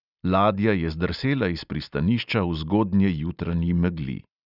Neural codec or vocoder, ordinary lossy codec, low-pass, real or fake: none; none; 5.4 kHz; real